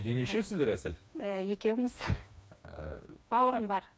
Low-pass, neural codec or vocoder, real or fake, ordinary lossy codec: none; codec, 16 kHz, 2 kbps, FreqCodec, smaller model; fake; none